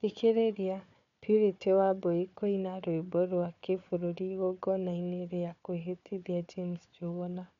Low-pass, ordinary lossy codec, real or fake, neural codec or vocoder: 7.2 kHz; none; fake; codec, 16 kHz, 4 kbps, FunCodec, trained on Chinese and English, 50 frames a second